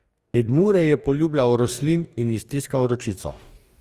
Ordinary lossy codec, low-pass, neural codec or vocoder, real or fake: Opus, 32 kbps; 14.4 kHz; codec, 44.1 kHz, 2.6 kbps, DAC; fake